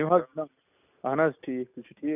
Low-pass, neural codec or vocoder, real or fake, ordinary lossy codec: 3.6 kHz; none; real; none